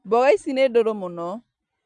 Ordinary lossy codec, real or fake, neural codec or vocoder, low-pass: none; real; none; 9.9 kHz